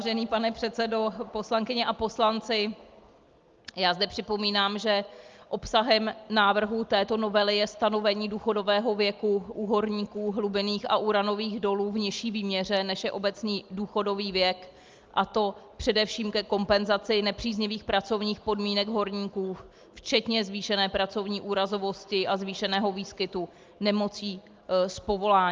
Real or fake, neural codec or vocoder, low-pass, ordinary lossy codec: real; none; 7.2 kHz; Opus, 32 kbps